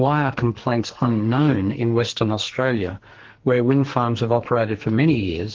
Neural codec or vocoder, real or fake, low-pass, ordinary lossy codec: codec, 44.1 kHz, 2.6 kbps, SNAC; fake; 7.2 kHz; Opus, 16 kbps